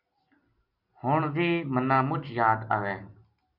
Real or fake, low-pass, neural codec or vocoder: real; 5.4 kHz; none